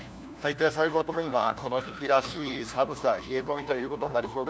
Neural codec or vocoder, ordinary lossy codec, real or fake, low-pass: codec, 16 kHz, 1 kbps, FunCodec, trained on LibriTTS, 50 frames a second; none; fake; none